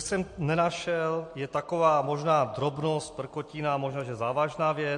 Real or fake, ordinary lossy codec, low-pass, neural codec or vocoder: real; MP3, 48 kbps; 10.8 kHz; none